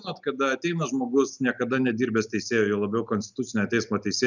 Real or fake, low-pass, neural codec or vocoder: real; 7.2 kHz; none